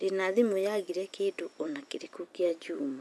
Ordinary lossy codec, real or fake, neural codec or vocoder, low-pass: none; fake; vocoder, 24 kHz, 100 mel bands, Vocos; none